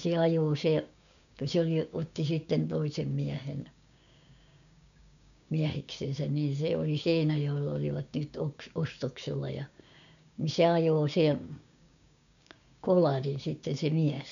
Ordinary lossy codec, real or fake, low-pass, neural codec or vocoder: none; fake; 7.2 kHz; codec, 16 kHz, 6 kbps, DAC